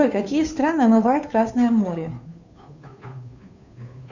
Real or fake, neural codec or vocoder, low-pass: fake; codec, 16 kHz, 2 kbps, FunCodec, trained on Chinese and English, 25 frames a second; 7.2 kHz